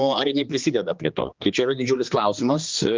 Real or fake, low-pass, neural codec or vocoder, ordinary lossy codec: fake; 7.2 kHz; codec, 16 kHz, 2 kbps, X-Codec, HuBERT features, trained on general audio; Opus, 24 kbps